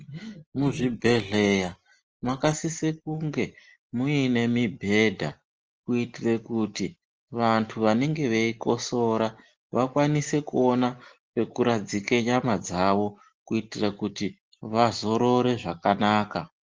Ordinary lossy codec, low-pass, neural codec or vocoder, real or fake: Opus, 16 kbps; 7.2 kHz; none; real